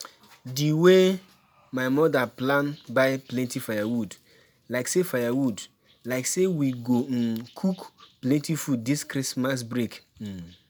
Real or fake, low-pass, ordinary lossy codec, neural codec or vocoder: real; none; none; none